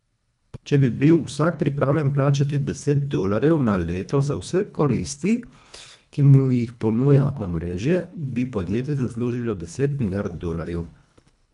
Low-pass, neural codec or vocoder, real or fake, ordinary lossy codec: 10.8 kHz; codec, 24 kHz, 1.5 kbps, HILCodec; fake; none